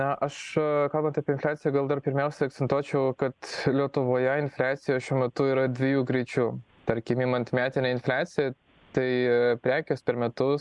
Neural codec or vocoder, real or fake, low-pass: none; real; 10.8 kHz